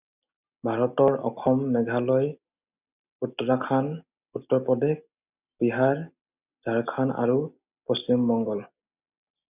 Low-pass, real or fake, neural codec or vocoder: 3.6 kHz; real; none